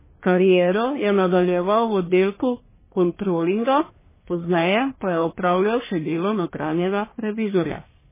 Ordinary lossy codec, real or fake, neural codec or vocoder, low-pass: MP3, 16 kbps; fake; codec, 44.1 kHz, 1.7 kbps, Pupu-Codec; 3.6 kHz